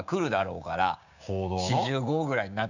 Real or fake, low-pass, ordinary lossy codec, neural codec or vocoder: fake; 7.2 kHz; none; codec, 16 kHz, 6 kbps, DAC